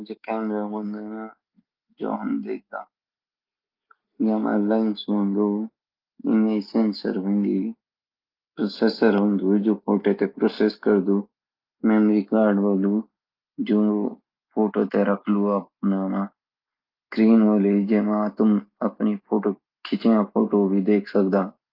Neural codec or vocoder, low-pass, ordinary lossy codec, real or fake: none; 5.4 kHz; Opus, 32 kbps; real